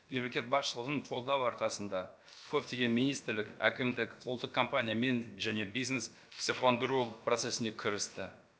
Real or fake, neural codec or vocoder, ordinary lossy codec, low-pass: fake; codec, 16 kHz, about 1 kbps, DyCAST, with the encoder's durations; none; none